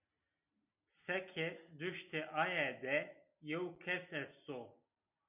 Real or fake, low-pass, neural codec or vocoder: real; 3.6 kHz; none